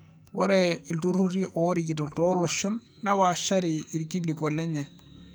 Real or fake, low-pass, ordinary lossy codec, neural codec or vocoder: fake; none; none; codec, 44.1 kHz, 2.6 kbps, SNAC